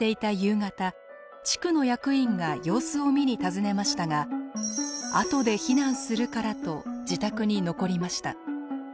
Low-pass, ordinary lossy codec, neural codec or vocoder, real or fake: none; none; none; real